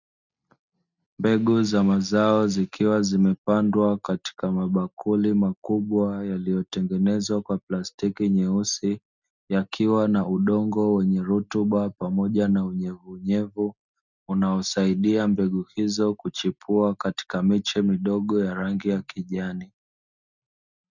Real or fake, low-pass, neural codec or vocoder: real; 7.2 kHz; none